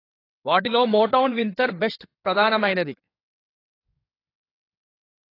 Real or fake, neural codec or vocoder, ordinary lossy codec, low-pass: fake; codec, 16 kHz in and 24 kHz out, 2.2 kbps, FireRedTTS-2 codec; AAC, 24 kbps; 5.4 kHz